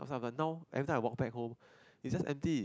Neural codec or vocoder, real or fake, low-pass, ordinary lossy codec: none; real; none; none